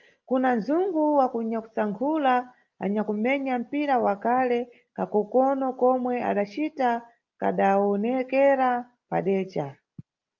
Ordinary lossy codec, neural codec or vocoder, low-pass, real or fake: Opus, 24 kbps; none; 7.2 kHz; real